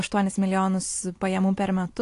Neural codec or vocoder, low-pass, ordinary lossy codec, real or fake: none; 10.8 kHz; AAC, 48 kbps; real